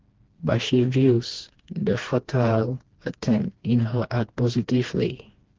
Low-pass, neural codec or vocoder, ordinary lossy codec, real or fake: 7.2 kHz; codec, 16 kHz, 2 kbps, FreqCodec, smaller model; Opus, 16 kbps; fake